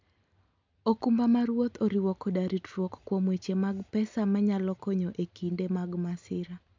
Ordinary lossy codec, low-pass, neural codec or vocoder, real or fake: MP3, 64 kbps; 7.2 kHz; none; real